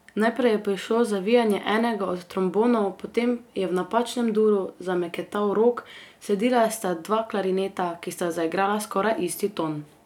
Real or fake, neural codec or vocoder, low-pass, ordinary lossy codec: real; none; 19.8 kHz; none